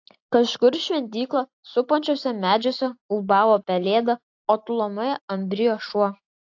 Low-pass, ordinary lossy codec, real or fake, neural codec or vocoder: 7.2 kHz; AAC, 48 kbps; real; none